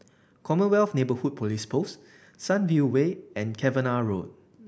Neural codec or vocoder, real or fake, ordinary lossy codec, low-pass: none; real; none; none